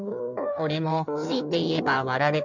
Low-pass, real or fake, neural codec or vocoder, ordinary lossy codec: 7.2 kHz; fake; codec, 16 kHz in and 24 kHz out, 1.1 kbps, FireRedTTS-2 codec; none